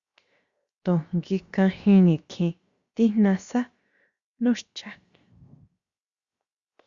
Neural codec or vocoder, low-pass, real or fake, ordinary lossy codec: codec, 16 kHz, 0.7 kbps, FocalCodec; 7.2 kHz; fake; Opus, 64 kbps